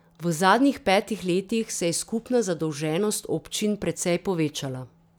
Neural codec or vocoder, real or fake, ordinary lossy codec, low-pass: none; real; none; none